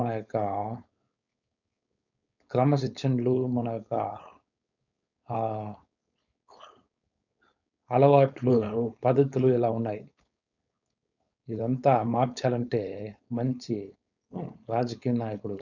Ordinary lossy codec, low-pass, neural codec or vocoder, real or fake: none; 7.2 kHz; codec, 16 kHz, 4.8 kbps, FACodec; fake